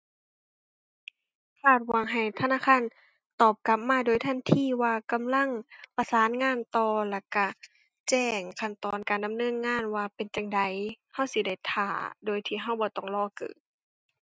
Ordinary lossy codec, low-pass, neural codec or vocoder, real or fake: none; none; none; real